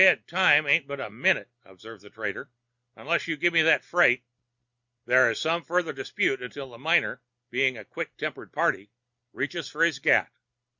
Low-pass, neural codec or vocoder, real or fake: 7.2 kHz; none; real